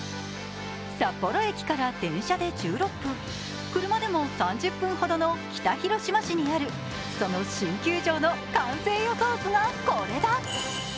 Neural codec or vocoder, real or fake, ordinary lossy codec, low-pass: none; real; none; none